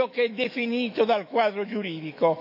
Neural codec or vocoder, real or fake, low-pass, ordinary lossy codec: autoencoder, 48 kHz, 128 numbers a frame, DAC-VAE, trained on Japanese speech; fake; 5.4 kHz; none